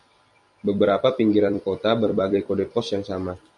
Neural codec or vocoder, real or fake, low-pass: vocoder, 44.1 kHz, 128 mel bands every 256 samples, BigVGAN v2; fake; 10.8 kHz